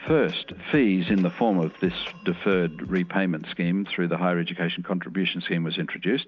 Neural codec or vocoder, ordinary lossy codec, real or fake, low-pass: none; MP3, 64 kbps; real; 7.2 kHz